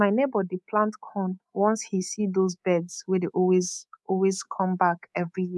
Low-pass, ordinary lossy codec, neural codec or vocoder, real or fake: none; none; codec, 24 kHz, 3.1 kbps, DualCodec; fake